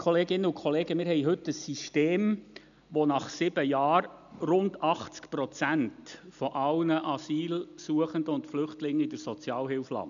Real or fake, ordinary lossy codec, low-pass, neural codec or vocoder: real; none; 7.2 kHz; none